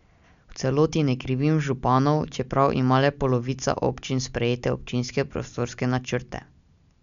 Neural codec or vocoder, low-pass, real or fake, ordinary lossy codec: none; 7.2 kHz; real; none